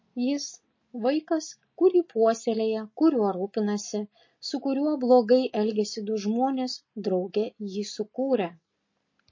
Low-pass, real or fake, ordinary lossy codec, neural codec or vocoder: 7.2 kHz; real; MP3, 32 kbps; none